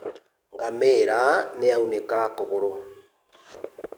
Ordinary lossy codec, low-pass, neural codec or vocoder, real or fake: none; none; codec, 44.1 kHz, 7.8 kbps, DAC; fake